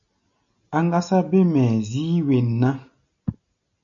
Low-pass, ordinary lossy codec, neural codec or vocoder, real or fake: 7.2 kHz; MP3, 96 kbps; none; real